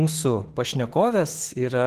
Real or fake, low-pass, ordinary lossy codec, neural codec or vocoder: fake; 14.4 kHz; Opus, 16 kbps; autoencoder, 48 kHz, 32 numbers a frame, DAC-VAE, trained on Japanese speech